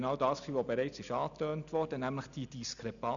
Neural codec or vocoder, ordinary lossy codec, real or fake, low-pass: none; none; real; 7.2 kHz